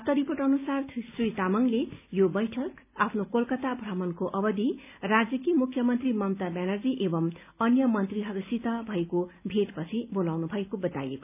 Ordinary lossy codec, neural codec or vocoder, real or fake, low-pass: none; none; real; 3.6 kHz